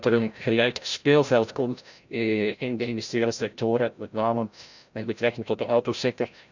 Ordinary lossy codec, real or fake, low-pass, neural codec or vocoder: none; fake; 7.2 kHz; codec, 16 kHz, 0.5 kbps, FreqCodec, larger model